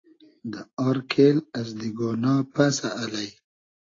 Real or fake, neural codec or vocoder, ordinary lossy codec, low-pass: real; none; AAC, 32 kbps; 7.2 kHz